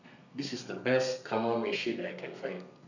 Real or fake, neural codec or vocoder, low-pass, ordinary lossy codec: fake; codec, 32 kHz, 1.9 kbps, SNAC; 7.2 kHz; none